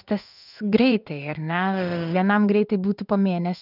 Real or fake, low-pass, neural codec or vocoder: fake; 5.4 kHz; codec, 16 kHz in and 24 kHz out, 1 kbps, XY-Tokenizer